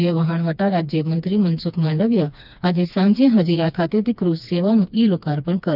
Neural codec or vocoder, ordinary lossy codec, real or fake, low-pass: codec, 16 kHz, 2 kbps, FreqCodec, smaller model; none; fake; 5.4 kHz